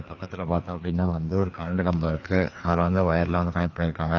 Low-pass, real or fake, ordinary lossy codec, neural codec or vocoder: 7.2 kHz; fake; AAC, 48 kbps; codec, 16 kHz, 2 kbps, FreqCodec, larger model